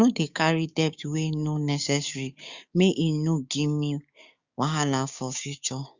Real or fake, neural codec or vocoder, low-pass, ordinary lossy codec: fake; codec, 16 kHz, 6 kbps, DAC; 7.2 kHz; Opus, 64 kbps